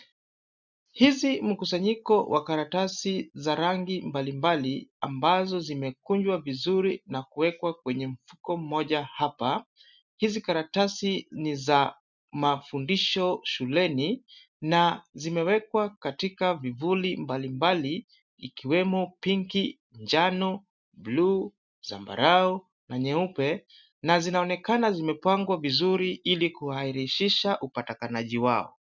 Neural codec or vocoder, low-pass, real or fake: none; 7.2 kHz; real